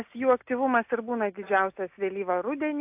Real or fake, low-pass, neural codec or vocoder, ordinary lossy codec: real; 3.6 kHz; none; AAC, 32 kbps